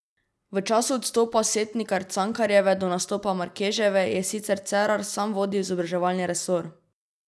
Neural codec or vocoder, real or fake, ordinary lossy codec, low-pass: none; real; none; none